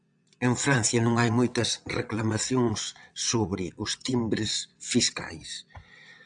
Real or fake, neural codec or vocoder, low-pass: fake; vocoder, 22.05 kHz, 80 mel bands, WaveNeXt; 9.9 kHz